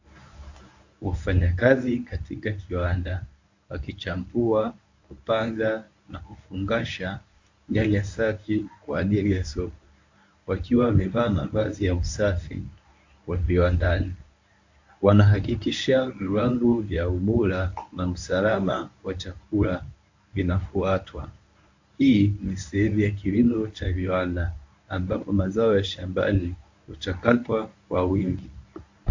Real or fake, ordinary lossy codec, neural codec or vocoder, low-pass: fake; AAC, 48 kbps; codec, 24 kHz, 0.9 kbps, WavTokenizer, medium speech release version 1; 7.2 kHz